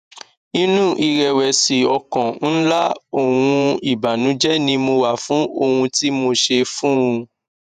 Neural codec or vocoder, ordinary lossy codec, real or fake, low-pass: vocoder, 48 kHz, 128 mel bands, Vocos; none; fake; 14.4 kHz